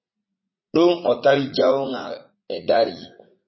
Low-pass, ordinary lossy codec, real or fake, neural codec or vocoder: 7.2 kHz; MP3, 24 kbps; fake; vocoder, 44.1 kHz, 80 mel bands, Vocos